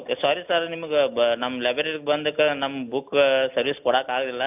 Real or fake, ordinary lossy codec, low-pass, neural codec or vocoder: real; AAC, 32 kbps; 3.6 kHz; none